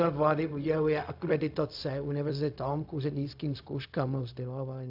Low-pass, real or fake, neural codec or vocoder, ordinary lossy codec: 5.4 kHz; fake; codec, 16 kHz, 0.4 kbps, LongCat-Audio-Codec; AAC, 48 kbps